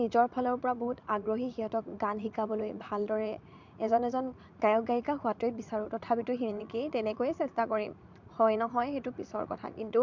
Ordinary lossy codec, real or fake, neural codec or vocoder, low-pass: MP3, 64 kbps; fake; vocoder, 44.1 kHz, 80 mel bands, Vocos; 7.2 kHz